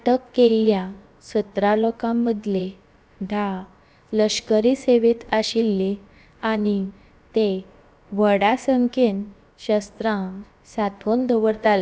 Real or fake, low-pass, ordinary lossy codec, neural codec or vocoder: fake; none; none; codec, 16 kHz, about 1 kbps, DyCAST, with the encoder's durations